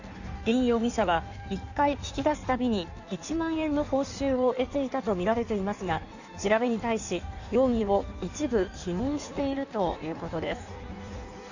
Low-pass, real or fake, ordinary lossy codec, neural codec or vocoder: 7.2 kHz; fake; none; codec, 16 kHz in and 24 kHz out, 1.1 kbps, FireRedTTS-2 codec